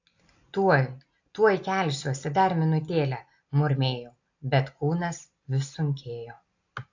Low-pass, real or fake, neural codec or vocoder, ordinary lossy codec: 7.2 kHz; real; none; AAC, 48 kbps